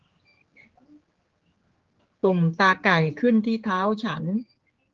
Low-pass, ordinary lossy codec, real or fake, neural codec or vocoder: 7.2 kHz; Opus, 16 kbps; fake; codec, 16 kHz, 4 kbps, X-Codec, HuBERT features, trained on general audio